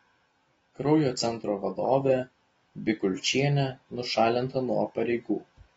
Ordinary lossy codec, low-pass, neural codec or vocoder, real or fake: AAC, 24 kbps; 19.8 kHz; none; real